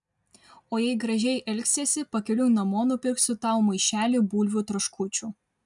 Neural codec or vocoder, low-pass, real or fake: none; 10.8 kHz; real